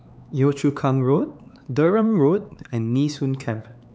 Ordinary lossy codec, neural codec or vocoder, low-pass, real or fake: none; codec, 16 kHz, 4 kbps, X-Codec, HuBERT features, trained on LibriSpeech; none; fake